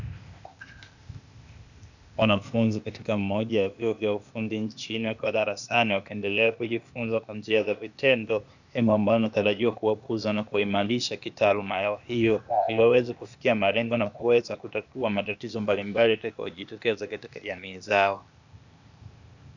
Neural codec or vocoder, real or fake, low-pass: codec, 16 kHz, 0.8 kbps, ZipCodec; fake; 7.2 kHz